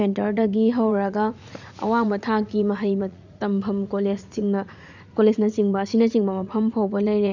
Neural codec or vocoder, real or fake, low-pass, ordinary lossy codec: vocoder, 44.1 kHz, 80 mel bands, Vocos; fake; 7.2 kHz; none